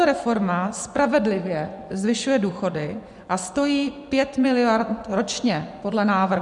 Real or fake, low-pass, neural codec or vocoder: fake; 10.8 kHz; vocoder, 24 kHz, 100 mel bands, Vocos